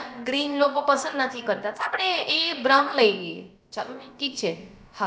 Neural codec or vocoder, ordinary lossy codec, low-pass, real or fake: codec, 16 kHz, about 1 kbps, DyCAST, with the encoder's durations; none; none; fake